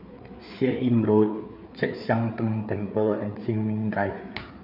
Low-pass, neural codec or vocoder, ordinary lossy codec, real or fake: 5.4 kHz; codec, 16 kHz, 4 kbps, FreqCodec, larger model; none; fake